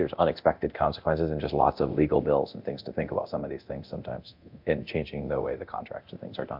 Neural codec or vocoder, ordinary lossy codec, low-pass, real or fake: codec, 24 kHz, 0.9 kbps, DualCodec; MP3, 48 kbps; 5.4 kHz; fake